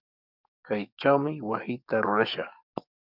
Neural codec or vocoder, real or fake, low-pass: codec, 44.1 kHz, 7.8 kbps, DAC; fake; 5.4 kHz